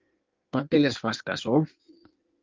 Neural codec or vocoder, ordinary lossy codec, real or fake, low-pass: codec, 16 kHz in and 24 kHz out, 1.1 kbps, FireRedTTS-2 codec; Opus, 32 kbps; fake; 7.2 kHz